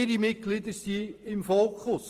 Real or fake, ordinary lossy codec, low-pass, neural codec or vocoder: fake; Opus, 24 kbps; 14.4 kHz; vocoder, 44.1 kHz, 128 mel bands every 512 samples, BigVGAN v2